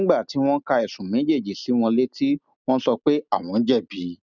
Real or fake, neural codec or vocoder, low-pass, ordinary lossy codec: real; none; 7.2 kHz; none